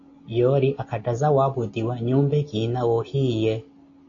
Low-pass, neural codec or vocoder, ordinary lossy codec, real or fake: 7.2 kHz; none; AAC, 32 kbps; real